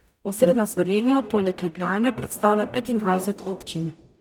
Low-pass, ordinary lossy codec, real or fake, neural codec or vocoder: none; none; fake; codec, 44.1 kHz, 0.9 kbps, DAC